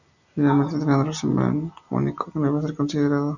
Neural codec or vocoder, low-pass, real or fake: none; 7.2 kHz; real